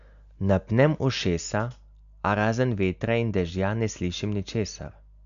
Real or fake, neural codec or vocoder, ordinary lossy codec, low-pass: real; none; none; 7.2 kHz